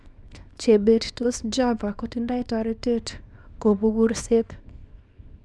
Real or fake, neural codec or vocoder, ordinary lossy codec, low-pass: fake; codec, 24 kHz, 0.9 kbps, WavTokenizer, small release; none; none